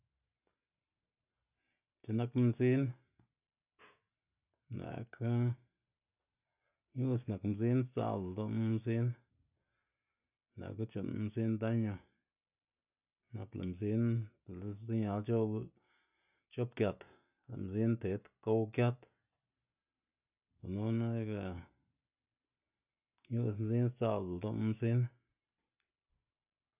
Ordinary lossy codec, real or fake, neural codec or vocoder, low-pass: none; real; none; 3.6 kHz